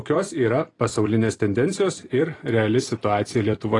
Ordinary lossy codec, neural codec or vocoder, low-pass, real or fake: AAC, 32 kbps; vocoder, 44.1 kHz, 128 mel bands every 256 samples, BigVGAN v2; 10.8 kHz; fake